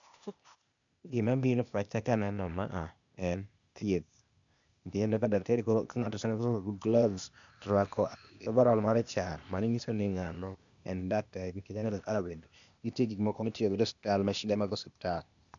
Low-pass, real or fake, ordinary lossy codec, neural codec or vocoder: 7.2 kHz; fake; MP3, 96 kbps; codec, 16 kHz, 0.8 kbps, ZipCodec